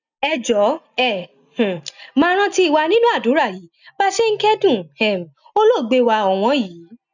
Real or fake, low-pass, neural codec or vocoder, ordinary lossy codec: real; 7.2 kHz; none; none